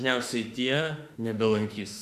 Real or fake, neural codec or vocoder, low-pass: fake; autoencoder, 48 kHz, 32 numbers a frame, DAC-VAE, trained on Japanese speech; 14.4 kHz